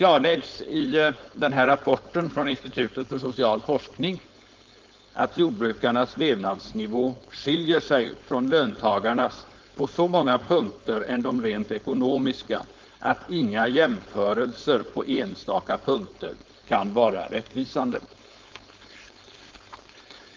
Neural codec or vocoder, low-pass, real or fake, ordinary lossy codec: codec, 16 kHz, 4.8 kbps, FACodec; 7.2 kHz; fake; Opus, 32 kbps